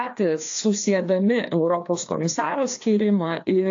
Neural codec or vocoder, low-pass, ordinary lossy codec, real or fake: codec, 16 kHz, 2 kbps, FreqCodec, larger model; 7.2 kHz; AAC, 48 kbps; fake